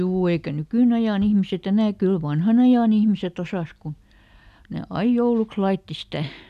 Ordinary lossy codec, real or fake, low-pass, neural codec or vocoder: none; real; 14.4 kHz; none